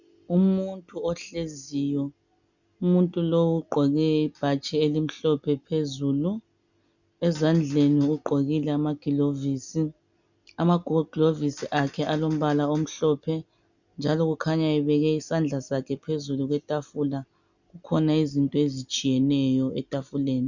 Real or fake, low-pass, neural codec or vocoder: real; 7.2 kHz; none